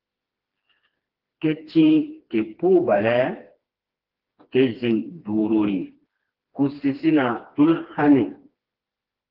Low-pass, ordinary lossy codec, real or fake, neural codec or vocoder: 5.4 kHz; Opus, 16 kbps; fake; codec, 16 kHz, 2 kbps, FreqCodec, smaller model